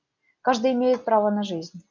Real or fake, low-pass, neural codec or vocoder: real; 7.2 kHz; none